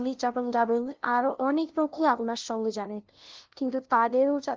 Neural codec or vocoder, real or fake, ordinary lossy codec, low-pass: codec, 16 kHz, 0.5 kbps, FunCodec, trained on LibriTTS, 25 frames a second; fake; Opus, 16 kbps; 7.2 kHz